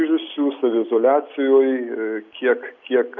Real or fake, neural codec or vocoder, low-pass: real; none; 7.2 kHz